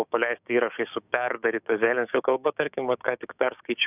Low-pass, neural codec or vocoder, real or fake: 3.6 kHz; codec, 16 kHz, 6 kbps, DAC; fake